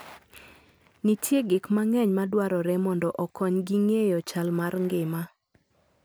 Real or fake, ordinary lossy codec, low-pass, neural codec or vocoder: real; none; none; none